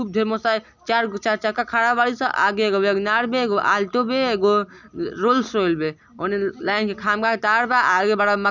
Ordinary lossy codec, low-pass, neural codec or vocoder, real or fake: none; 7.2 kHz; none; real